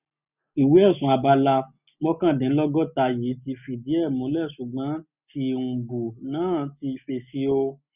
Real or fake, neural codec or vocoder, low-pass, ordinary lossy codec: real; none; 3.6 kHz; none